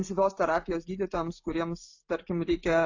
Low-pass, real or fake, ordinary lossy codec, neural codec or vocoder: 7.2 kHz; real; AAC, 48 kbps; none